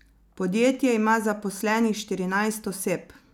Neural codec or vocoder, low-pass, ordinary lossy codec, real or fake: none; 19.8 kHz; none; real